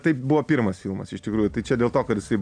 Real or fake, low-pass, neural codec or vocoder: real; 9.9 kHz; none